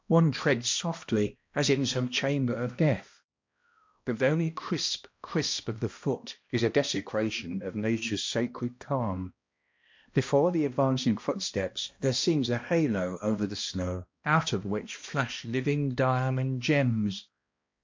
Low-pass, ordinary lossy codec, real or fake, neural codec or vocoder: 7.2 kHz; MP3, 48 kbps; fake; codec, 16 kHz, 1 kbps, X-Codec, HuBERT features, trained on balanced general audio